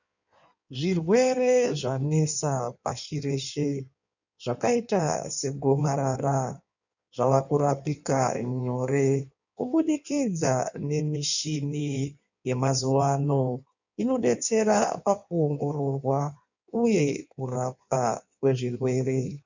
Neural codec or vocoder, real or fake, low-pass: codec, 16 kHz in and 24 kHz out, 1.1 kbps, FireRedTTS-2 codec; fake; 7.2 kHz